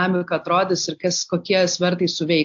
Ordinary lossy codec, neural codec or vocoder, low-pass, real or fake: AAC, 64 kbps; none; 7.2 kHz; real